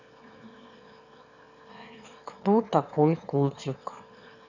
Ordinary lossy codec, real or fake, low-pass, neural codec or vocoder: none; fake; 7.2 kHz; autoencoder, 22.05 kHz, a latent of 192 numbers a frame, VITS, trained on one speaker